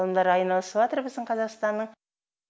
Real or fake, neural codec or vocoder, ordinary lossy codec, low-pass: real; none; none; none